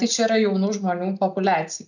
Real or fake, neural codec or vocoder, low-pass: real; none; 7.2 kHz